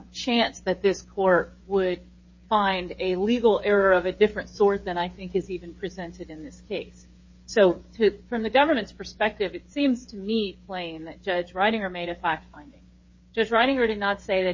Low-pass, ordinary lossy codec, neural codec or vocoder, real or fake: 7.2 kHz; MP3, 32 kbps; codec, 16 kHz, 8 kbps, FreqCodec, smaller model; fake